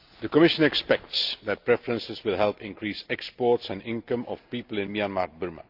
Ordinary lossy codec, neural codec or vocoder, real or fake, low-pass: Opus, 24 kbps; none; real; 5.4 kHz